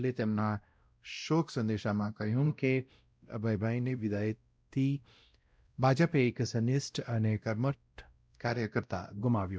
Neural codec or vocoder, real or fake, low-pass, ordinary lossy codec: codec, 16 kHz, 0.5 kbps, X-Codec, WavLM features, trained on Multilingual LibriSpeech; fake; none; none